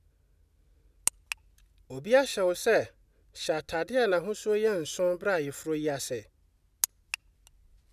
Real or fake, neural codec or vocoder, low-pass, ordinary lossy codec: real; none; 14.4 kHz; none